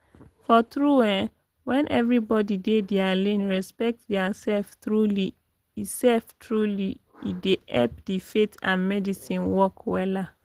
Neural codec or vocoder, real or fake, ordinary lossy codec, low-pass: none; real; Opus, 16 kbps; 14.4 kHz